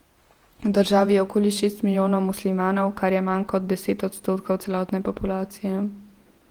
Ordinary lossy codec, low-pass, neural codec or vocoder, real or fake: Opus, 24 kbps; 19.8 kHz; vocoder, 48 kHz, 128 mel bands, Vocos; fake